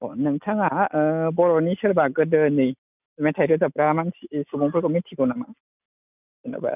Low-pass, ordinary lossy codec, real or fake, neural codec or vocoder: 3.6 kHz; none; real; none